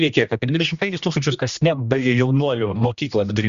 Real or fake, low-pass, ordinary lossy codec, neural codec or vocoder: fake; 7.2 kHz; Opus, 64 kbps; codec, 16 kHz, 1 kbps, X-Codec, HuBERT features, trained on general audio